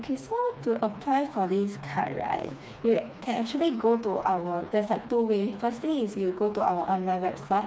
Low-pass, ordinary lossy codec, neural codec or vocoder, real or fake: none; none; codec, 16 kHz, 2 kbps, FreqCodec, smaller model; fake